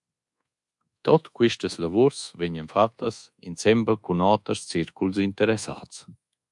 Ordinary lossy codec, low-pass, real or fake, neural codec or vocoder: MP3, 64 kbps; 10.8 kHz; fake; codec, 24 kHz, 1.2 kbps, DualCodec